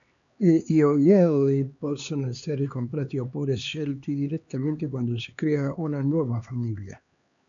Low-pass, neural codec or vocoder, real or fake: 7.2 kHz; codec, 16 kHz, 2 kbps, X-Codec, HuBERT features, trained on LibriSpeech; fake